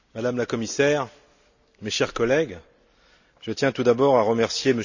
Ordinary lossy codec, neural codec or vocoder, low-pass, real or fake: none; none; 7.2 kHz; real